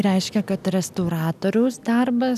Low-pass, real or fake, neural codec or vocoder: 14.4 kHz; real; none